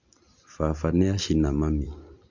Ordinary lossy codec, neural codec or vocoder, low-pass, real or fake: MP3, 48 kbps; none; 7.2 kHz; real